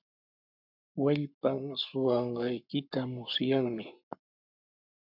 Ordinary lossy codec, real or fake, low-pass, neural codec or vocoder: MP3, 48 kbps; fake; 5.4 kHz; codec, 44.1 kHz, 7.8 kbps, Pupu-Codec